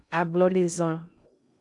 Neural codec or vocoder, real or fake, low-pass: codec, 16 kHz in and 24 kHz out, 0.6 kbps, FocalCodec, streaming, 2048 codes; fake; 10.8 kHz